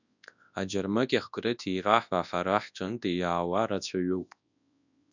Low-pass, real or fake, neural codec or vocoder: 7.2 kHz; fake; codec, 24 kHz, 0.9 kbps, WavTokenizer, large speech release